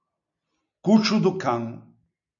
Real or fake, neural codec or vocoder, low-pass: real; none; 7.2 kHz